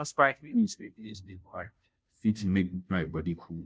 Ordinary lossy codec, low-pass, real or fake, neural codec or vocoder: none; none; fake; codec, 16 kHz, 0.5 kbps, FunCodec, trained on Chinese and English, 25 frames a second